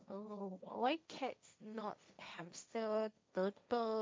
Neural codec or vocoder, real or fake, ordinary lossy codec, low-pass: codec, 16 kHz, 1.1 kbps, Voila-Tokenizer; fake; none; none